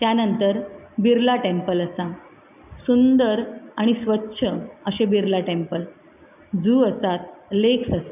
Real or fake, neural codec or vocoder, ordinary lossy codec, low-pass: real; none; none; 3.6 kHz